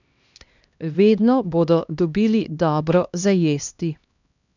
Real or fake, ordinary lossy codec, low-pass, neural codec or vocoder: fake; none; 7.2 kHz; codec, 16 kHz, 1 kbps, X-Codec, HuBERT features, trained on LibriSpeech